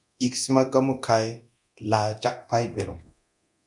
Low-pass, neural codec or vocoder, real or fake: 10.8 kHz; codec, 24 kHz, 0.9 kbps, DualCodec; fake